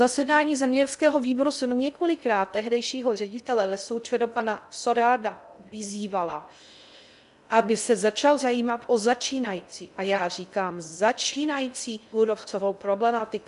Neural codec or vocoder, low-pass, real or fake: codec, 16 kHz in and 24 kHz out, 0.6 kbps, FocalCodec, streaming, 2048 codes; 10.8 kHz; fake